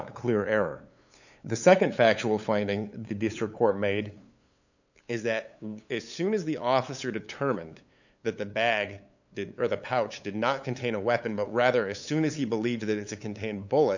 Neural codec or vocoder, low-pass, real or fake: codec, 16 kHz, 8 kbps, FunCodec, trained on LibriTTS, 25 frames a second; 7.2 kHz; fake